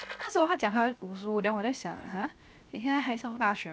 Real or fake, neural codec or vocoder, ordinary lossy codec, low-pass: fake; codec, 16 kHz, 0.7 kbps, FocalCodec; none; none